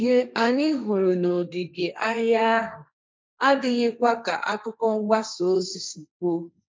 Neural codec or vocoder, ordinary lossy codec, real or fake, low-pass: codec, 16 kHz, 1.1 kbps, Voila-Tokenizer; none; fake; none